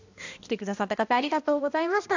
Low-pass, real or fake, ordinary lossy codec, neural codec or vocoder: 7.2 kHz; fake; AAC, 48 kbps; codec, 16 kHz, 1 kbps, X-Codec, HuBERT features, trained on balanced general audio